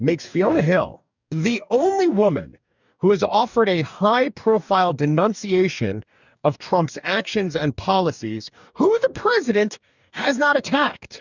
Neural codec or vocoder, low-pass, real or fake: codec, 44.1 kHz, 2.6 kbps, DAC; 7.2 kHz; fake